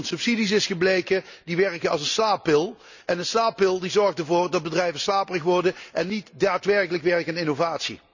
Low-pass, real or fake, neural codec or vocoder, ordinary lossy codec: 7.2 kHz; real; none; none